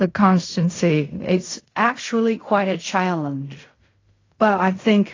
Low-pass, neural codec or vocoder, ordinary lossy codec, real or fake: 7.2 kHz; codec, 16 kHz in and 24 kHz out, 0.4 kbps, LongCat-Audio-Codec, fine tuned four codebook decoder; AAC, 32 kbps; fake